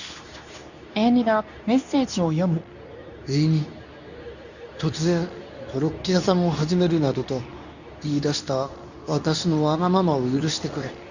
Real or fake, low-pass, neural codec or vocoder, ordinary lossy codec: fake; 7.2 kHz; codec, 24 kHz, 0.9 kbps, WavTokenizer, medium speech release version 2; none